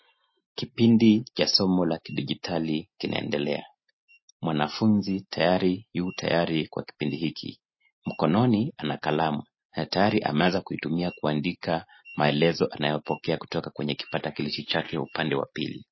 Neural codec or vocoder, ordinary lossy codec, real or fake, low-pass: none; MP3, 24 kbps; real; 7.2 kHz